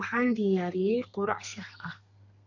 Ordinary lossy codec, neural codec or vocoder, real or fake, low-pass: none; codec, 32 kHz, 1.9 kbps, SNAC; fake; 7.2 kHz